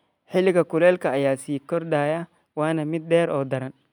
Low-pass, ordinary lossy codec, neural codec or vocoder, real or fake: 19.8 kHz; none; none; real